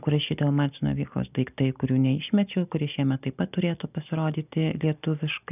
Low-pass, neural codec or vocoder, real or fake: 3.6 kHz; none; real